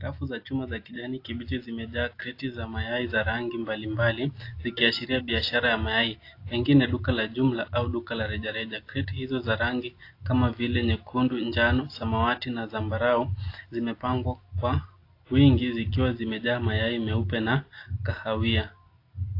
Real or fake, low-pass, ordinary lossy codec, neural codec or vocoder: real; 5.4 kHz; AAC, 32 kbps; none